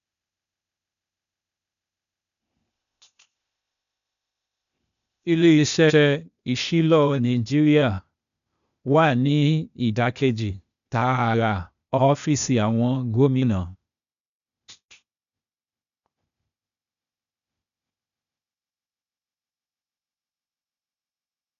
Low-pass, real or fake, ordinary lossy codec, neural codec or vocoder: 7.2 kHz; fake; MP3, 96 kbps; codec, 16 kHz, 0.8 kbps, ZipCodec